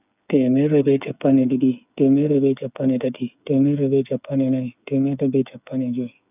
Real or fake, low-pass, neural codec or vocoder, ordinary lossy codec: fake; 3.6 kHz; codec, 16 kHz, 8 kbps, FreqCodec, smaller model; none